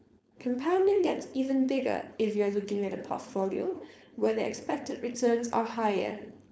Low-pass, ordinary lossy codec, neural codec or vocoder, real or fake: none; none; codec, 16 kHz, 4.8 kbps, FACodec; fake